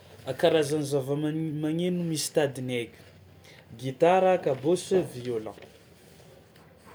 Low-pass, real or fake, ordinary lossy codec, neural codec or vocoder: none; real; none; none